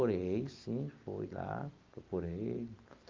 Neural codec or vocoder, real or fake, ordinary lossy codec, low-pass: none; real; Opus, 32 kbps; 7.2 kHz